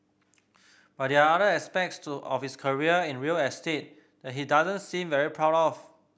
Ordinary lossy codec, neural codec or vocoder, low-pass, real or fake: none; none; none; real